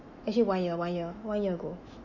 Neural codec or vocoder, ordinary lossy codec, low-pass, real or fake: autoencoder, 48 kHz, 128 numbers a frame, DAC-VAE, trained on Japanese speech; Opus, 64 kbps; 7.2 kHz; fake